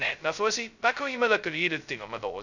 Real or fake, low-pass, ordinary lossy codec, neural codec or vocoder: fake; 7.2 kHz; none; codec, 16 kHz, 0.2 kbps, FocalCodec